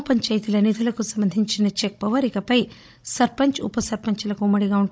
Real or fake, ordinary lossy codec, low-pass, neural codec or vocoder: fake; none; none; codec, 16 kHz, 16 kbps, FunCodec, trained on Chinese and English, 50 frames a second